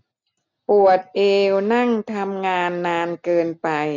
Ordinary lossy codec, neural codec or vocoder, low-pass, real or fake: none; none; 7.2 kHz; real